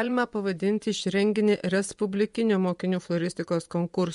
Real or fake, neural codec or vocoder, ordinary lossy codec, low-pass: fake; vocoder, 24 kHz, 100 mel bands, Vocos; MP3, 64 kbps; 10.8 kHz